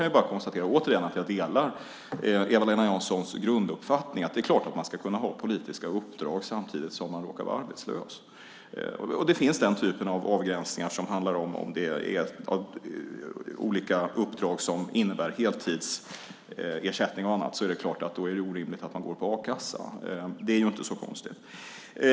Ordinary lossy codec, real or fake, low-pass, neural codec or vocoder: none; real; none; none